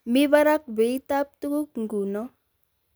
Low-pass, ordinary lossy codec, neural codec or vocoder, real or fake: none; none; none; real